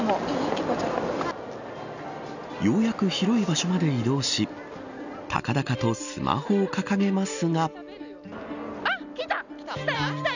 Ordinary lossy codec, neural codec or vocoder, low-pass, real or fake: none; none; 7.2 kHz; real